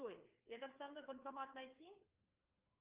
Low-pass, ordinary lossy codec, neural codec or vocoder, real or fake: 3.6 kHz; Opus, 24 kbps; codec, 16 kHz, 4 kbps, FreqCodec, larger model; fake